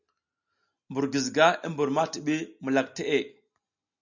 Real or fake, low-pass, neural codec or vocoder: real; 7.2 kHz; none